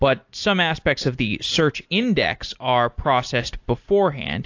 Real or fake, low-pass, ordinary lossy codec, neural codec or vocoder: real; 7.2 kHz; AAC, 48 kbps; none